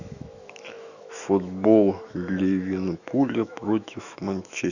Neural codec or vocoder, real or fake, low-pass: autoencoder, 48 kHz, 128 numbers a frame, DAC-VAE, trained on Japanese speech; fake; 7.2 kHz